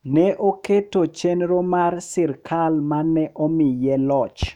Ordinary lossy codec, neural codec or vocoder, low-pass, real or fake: none; autoencoder, 48 kHz, 128 numbers a frame, DAC-VAE, trained on Japanese speech; 19.8 kHz; fake